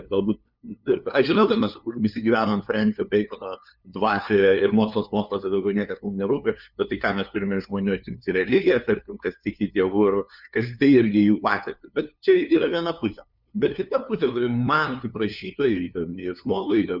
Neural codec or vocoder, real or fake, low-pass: codec, 16 kHz, 2 kbps, FunCodec, trained on LibriTTS, 25 frames a second; fake; 5.4 kHz